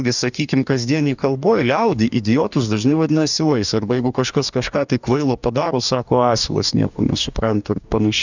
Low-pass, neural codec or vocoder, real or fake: 7.2 kHz; codec, 44.1 kHz, 2.6 kbps, DAC; fake